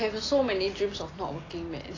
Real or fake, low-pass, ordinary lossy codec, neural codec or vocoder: real; 7.2 kHz; AAC, 32 kbps; none